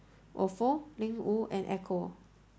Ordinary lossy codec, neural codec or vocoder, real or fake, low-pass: none; none; real; none